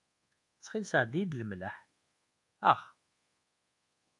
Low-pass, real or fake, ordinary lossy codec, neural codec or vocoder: 10.8 kHz; fake; AAC, 48 kbps; codec, 24 kHz, 1.2 kbps, DualCodec